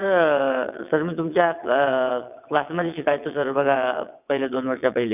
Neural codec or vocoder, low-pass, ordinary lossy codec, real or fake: vocoder, 22.05 kHz, 80 mel bands, WaveNeXt; 3.6 kHz; none; fake